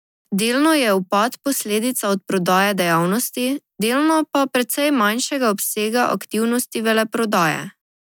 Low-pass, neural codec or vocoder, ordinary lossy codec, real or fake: none; none; none; real